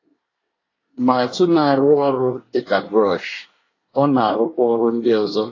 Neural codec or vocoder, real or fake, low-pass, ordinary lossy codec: codec, 24 kHz, 1 kbps, SNAC; fake; 7.2 kHz; AAC, 32 kbps